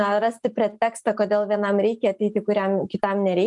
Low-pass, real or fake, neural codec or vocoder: 10.8 kHz; real; none